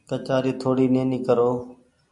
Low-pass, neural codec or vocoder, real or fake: 10.8 kHz; none; real